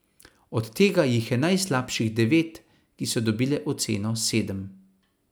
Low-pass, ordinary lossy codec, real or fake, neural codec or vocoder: none; none; real; none